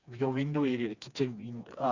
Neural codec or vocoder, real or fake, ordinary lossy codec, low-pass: codec, 16 kHz, 2 kbps, FreqCodec, smaller model; fake; Opus, 64 kbps; 7.2 kHz